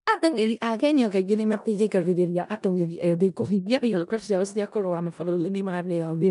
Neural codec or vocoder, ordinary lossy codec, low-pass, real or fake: codec, 16 kHz in and 24 kHz out, 0.4 kbps, LongCat-Audio-Codec, four codebook decoder; none; 10.8 kHz; fake